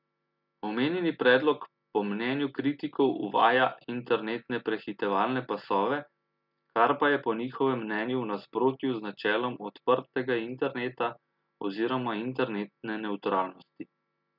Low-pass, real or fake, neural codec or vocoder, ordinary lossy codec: 5.4 kHz; real; none; none